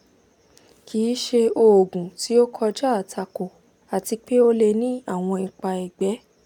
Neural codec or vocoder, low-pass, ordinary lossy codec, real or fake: none; 19.8 kHz; none; real